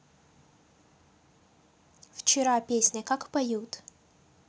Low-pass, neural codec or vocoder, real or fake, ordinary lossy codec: none; none; real; none